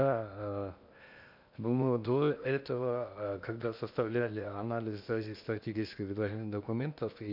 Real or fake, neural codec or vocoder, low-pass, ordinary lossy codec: fake; codec, 16 kHz in and 24 kHz out, 0.8 kbps, FocalCodec, streaming, 65536 codes; 5.4 kHz; none